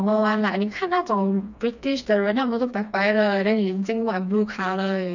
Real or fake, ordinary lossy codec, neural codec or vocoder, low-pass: fake; none; codec, 16 kHz, 2 kbps, FreqCodec, smaller model; 7.2 kHz